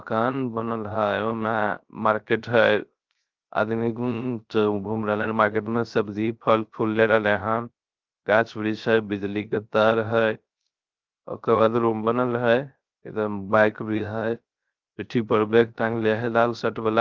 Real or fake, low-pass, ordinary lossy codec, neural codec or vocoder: fake; 7.2 kHz; Opus, 24 kbps; codec, 16 kHz, 0.3 kbps, FocalCodec